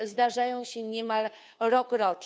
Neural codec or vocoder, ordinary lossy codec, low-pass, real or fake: codec, 16 kHz, 2 kbps, FunCodec, trained on Chinese and English, 25 frames a second; none; none; fake